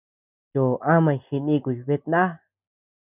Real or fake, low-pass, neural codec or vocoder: real; 3.6 kHz; none